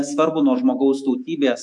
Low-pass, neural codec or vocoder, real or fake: 10.8 kHz; autoencoder, 48 kHz, 128 numbers a frame, DAC-VAE, trained on Japanese speech; fake